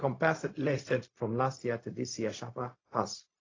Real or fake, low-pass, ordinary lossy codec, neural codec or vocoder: fake; 7.2 kHz; AAC, 32 kbps; codec, 16 kHz, 0.4 kbps, LongCat-Audio-Codec